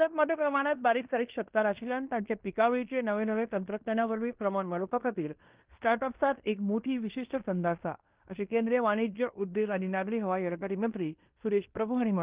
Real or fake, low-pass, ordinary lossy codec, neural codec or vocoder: fake; 3.6 kHz; Opus, 32 kbps; codec, 16 kHz in and 24 kHz out, 0.9 kbps, LongCat-Audio-Codec, fine tuned four codebook decoder